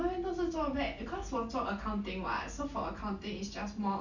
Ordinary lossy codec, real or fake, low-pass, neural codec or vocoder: none; real; 7.2 kHz; none